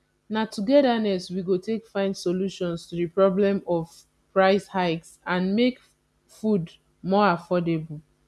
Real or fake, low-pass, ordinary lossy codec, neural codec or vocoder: real; none; none; none